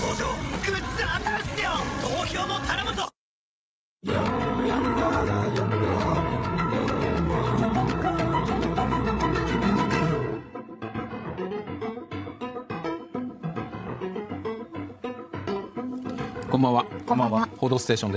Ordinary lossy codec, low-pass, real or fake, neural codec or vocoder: none; none; fake; codec, 16 kHz, 16 kbps, FreqCodec, larger model